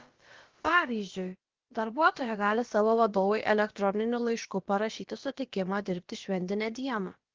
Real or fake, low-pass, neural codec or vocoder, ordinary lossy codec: fake; 7.2 kHz; codec, 16 kHz, about 1 kbps, DyCAST, with the encoder's durations; Opus, 16 kbps